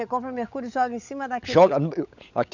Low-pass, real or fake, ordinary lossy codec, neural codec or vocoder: 7.2 kHz; fake; none; codec, 16 kHz, 16 kbps, FunCodec, trained on LibriTTS, 50 frames a second